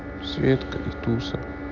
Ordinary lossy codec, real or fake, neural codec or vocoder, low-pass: none; real; none; 7.2 kHz